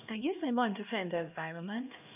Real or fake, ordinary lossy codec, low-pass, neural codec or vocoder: fake; none; 3.6 kHz; codec, 16 kHz, 1 kbps, X-Codec, HuBERT features, trained on LibriSpeech